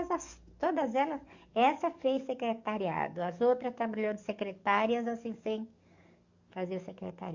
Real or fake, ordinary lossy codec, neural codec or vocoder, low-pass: fake; Opus, 64 kbps; codec, 44.1 kHz, 7.8 kbps, DAC; 7.2 kHz